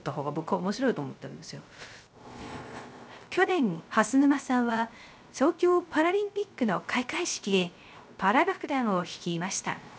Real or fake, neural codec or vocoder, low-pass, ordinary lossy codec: fake; codec, 16 kHz, 0.3 kbps, FocalCodec; none; none